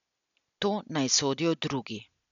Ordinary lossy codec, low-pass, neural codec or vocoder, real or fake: none; 7.2 kHz; none; real